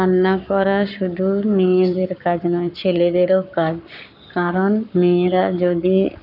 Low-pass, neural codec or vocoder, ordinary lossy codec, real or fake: 5.4 kHz; codec, 44.1 kHz, 7.8 kbps, Pupu-Codec; none; fake